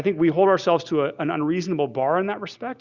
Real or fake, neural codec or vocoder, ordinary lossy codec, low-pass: real; none; Opus, 64 kbps; 7.2 kHz